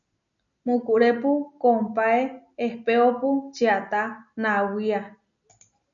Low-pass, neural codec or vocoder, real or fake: 7.2 kHz; none; real